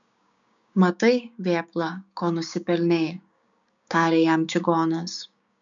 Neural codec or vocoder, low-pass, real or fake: codec, 16 kHz, 6 kbps, DAC; 7.2 kHz; fake